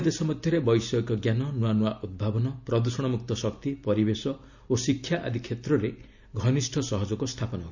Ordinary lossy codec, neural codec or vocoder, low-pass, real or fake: none; none; 7.2 kHz; real